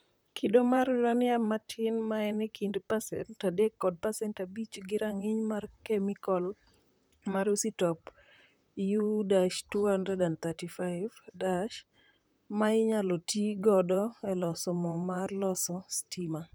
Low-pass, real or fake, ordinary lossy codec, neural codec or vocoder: none; fake; none; vocoder, 44.1 kHz, 128 mel bands, Pupu-Vocoder